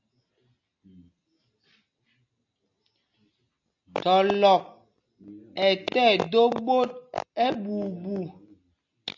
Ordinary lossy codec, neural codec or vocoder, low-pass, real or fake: AAC, 48 kbps; none; 7.2 kHz; real